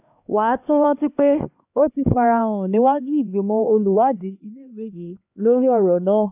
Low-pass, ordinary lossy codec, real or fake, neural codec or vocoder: 3.6 kHz; AAC, 32 kbps; fake; codec, 16 kHz, 2 kbps, X-Codec, HuBERT features, trained on LibriSpeech